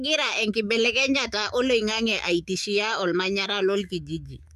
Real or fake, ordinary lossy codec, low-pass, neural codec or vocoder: fake; none; 14.4 kHz; autoencoder, 48 kHz, 128 numbers a frame, DAC-VAE, trained on Japanese speech